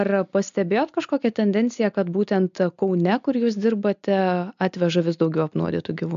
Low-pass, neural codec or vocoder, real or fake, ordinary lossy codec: 7.2 kHz; none; real; MP3, 64 kbps